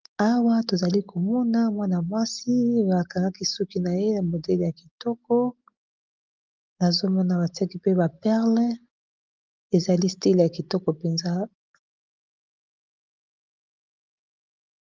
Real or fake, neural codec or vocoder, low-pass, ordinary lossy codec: real; none; 7.2 kHz; Opus, 24 kbps